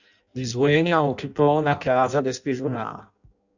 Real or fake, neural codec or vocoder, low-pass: fake; codec, 16 kHz in and 24 kHz out, 0.6 kbps, FireRedTTS-2 codec; 7.2 kHz